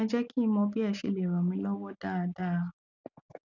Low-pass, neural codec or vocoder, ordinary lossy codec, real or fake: 7.2 kHz; none; none; real